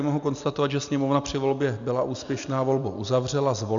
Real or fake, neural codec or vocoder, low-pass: real; none; 7.2 kHz